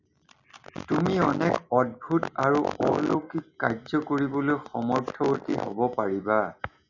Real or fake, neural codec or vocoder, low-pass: real; none; 7.2 kHz